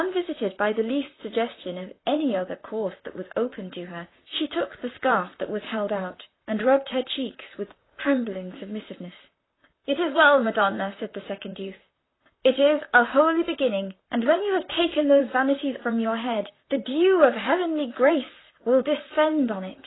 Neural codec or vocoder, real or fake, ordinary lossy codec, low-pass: vocoder, 44.1 kHz, 128 mel bands, Pupu-Vocoder; fake; AAC, 16 kbps; 7.2 kHz